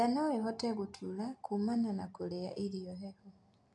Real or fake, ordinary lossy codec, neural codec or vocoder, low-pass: real; AAC, 64 kbps; none; 10.8 kHz